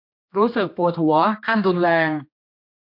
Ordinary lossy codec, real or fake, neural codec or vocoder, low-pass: MP3, 48 kbps; fake; codec, 16 kHz, 2 kbps, X-Codec, HuBERT features, trained on general audio; 5.4 kHz